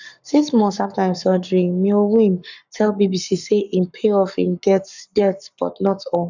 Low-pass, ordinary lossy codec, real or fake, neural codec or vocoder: 7.2 kHz; none; fake; codec, 44.1 kHz, 7.8 kbps, Pupu-Codec